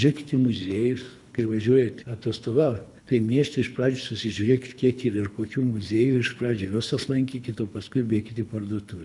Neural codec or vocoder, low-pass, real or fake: codec, 24 kHz, 3 kbps, HILCodec; 10.8 kHz; fake